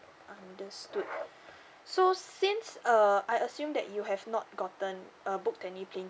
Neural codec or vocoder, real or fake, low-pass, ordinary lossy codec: none; real; none; none